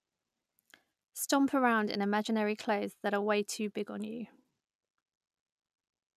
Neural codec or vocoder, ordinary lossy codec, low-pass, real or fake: none; none; 14.4 kHz; real